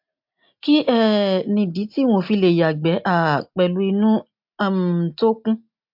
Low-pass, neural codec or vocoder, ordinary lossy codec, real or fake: 5.4 kHz; none; MP3, 48 kbps; real